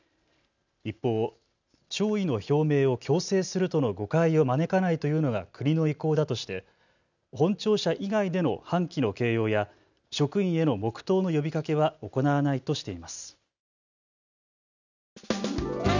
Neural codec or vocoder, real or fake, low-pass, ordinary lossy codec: none; real; 7.2 kHz; none